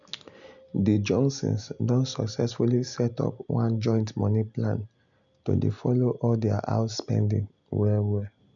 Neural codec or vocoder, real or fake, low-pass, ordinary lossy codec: none; real; 7.2 kHz; none